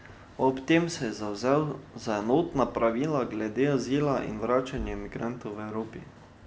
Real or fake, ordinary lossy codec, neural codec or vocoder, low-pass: real; none; none; none